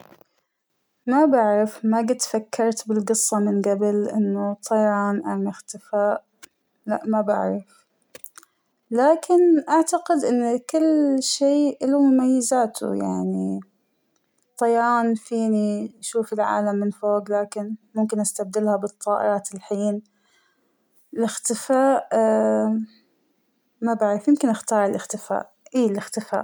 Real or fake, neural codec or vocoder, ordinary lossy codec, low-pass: real; none; none; none